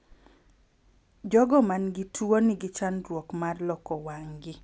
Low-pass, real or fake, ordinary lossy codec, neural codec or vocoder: none; real; none; none